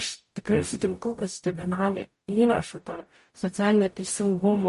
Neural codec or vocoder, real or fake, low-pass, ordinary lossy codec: codec, 44.1 kHz, 0.9 kbps, DAC; fake; 14.4 kHz; MP3, 48 kbps